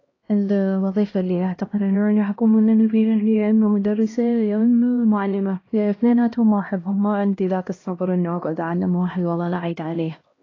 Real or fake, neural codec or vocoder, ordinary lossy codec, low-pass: fake; codec, 16 kHz, 1 kbps, X-Codec, HuBERT features, trained on LibriSpeech; AAC, 32 kbps; 7.2 kHz